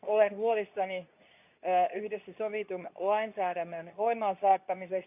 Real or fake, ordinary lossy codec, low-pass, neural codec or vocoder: fake; none; 3.6 kHz; codec, 24 kHz, 0.9 kbps, WavTokenizer, medium speech release version 2